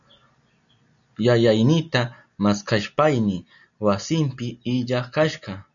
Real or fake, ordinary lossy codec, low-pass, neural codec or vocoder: real; MP3, 96 kbps; 7.2 kHz; none